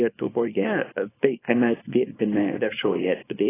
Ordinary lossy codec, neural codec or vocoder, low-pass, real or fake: AAC, 16 kbps; codec, 24 kHz, 0.9 kbps, WavTokenizer, small release; 3.6 kHz; fake